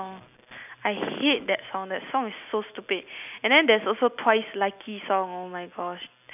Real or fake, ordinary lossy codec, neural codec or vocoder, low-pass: real; none; none; 3.6 kHz